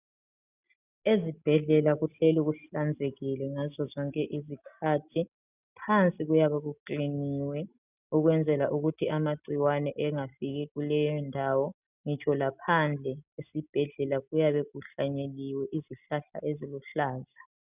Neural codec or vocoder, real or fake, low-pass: none; real; 3.6 kHz